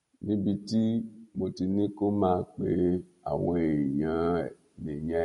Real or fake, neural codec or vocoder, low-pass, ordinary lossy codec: real; none; 19.8 kHz; MP3, 48 kbps